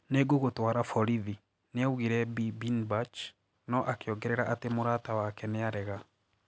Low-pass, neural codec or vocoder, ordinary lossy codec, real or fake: none; none; none; real